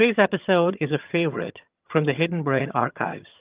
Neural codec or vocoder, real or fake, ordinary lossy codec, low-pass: vocoder, 22.05 kHz, 80 mel bands, HiFi-GAN; fake; Opus, 24 kbps; 3.6 kHz